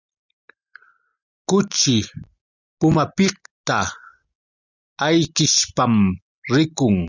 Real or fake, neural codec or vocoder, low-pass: real; none; 7.2 kHz